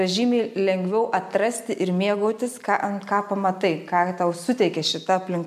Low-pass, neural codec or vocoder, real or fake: 14.4 kHz; none; real